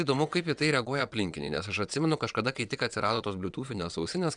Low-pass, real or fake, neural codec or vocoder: 9.9 kHz; fake; vocoder, 22.05 kHz, 80 mel bands, WaveNeXt